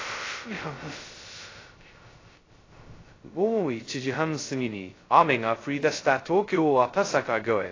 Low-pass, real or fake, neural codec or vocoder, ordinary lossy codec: 7.2 kHz; fake; codec, 16 kHz, 0.2 kbps, FocalCodec; AAC, 32 kbps